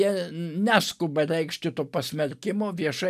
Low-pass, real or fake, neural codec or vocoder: 14.4 kHz; real; none